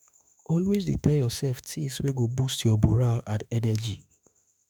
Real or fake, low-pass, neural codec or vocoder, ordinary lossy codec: fake; none; autoencoder, 48 kHz, 32 numbers a frame, DAC-VAE, trained on Japanese speech; none